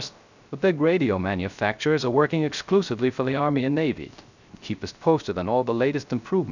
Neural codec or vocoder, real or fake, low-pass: codec, 16 kHz, 0.3 kbps, FocalCodec; fake; 7.2 kHz